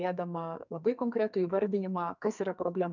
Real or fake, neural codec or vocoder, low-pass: fake; codec, 44.1 kHz, 2.6 kbps, SNAC; 7.2 kHz